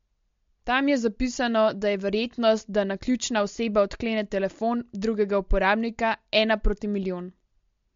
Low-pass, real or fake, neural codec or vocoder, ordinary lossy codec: 7.2 kHz; real; none; MP3, 48 kbps